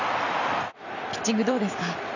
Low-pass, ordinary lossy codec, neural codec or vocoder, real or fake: 7.2 kHz; none; vocoder, 44.1 kHz, 128 mel bands every 256 samples, BigVGAN v2; fake